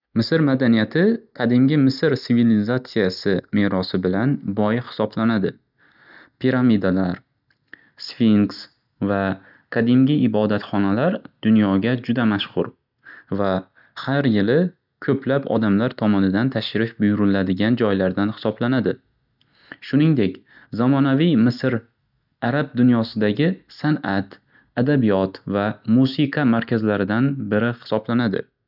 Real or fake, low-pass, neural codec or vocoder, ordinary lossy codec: real; 5.4 kHz; none; none